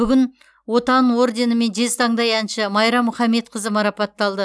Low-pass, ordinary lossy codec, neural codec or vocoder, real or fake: none; none; none; real